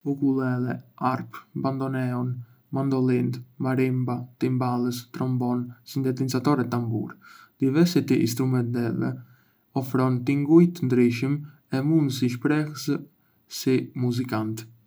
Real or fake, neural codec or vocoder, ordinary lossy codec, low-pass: real; none; none; none